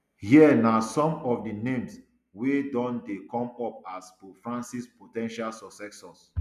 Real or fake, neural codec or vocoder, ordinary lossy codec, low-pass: real; none; none; 14.4 kHz